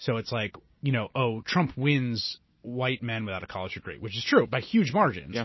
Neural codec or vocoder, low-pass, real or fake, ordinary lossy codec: none; 7.2 kHz; real; MP3, 24 kbps